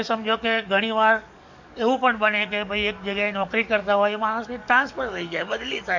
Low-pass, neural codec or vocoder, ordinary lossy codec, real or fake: 7.2 kHz; codec, 44.1 kHz, 7.8 kbps, DAC; none; fake